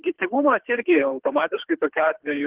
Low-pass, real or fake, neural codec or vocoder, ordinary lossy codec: 3.6 kHz; fake; codec, 24 kHz, 3 kbps, HILCodec; Opus, 16 kbps